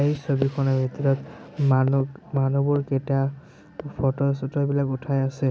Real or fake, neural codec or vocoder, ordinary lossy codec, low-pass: real; none; none; none